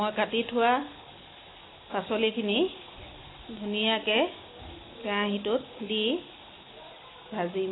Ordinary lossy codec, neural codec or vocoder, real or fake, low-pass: AAC, 16 kbps; none; real; 7.2 kHz